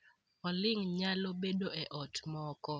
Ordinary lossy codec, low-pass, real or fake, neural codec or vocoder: none; none; real; none